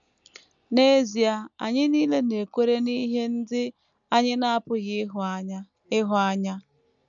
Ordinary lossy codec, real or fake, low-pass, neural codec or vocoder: none; real; 7.2 kHz; none